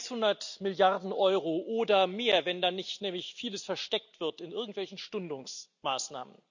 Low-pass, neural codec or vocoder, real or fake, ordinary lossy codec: 7.2 kHz; none; real; none